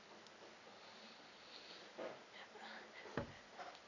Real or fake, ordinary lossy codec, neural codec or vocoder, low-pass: real; none; none; 7.2 kHz